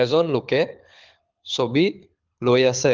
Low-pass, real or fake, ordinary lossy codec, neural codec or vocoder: 7.2 kHz; fake; Opus, 24 kbps; codec, 24 kHz, 6 kbps, HILCodec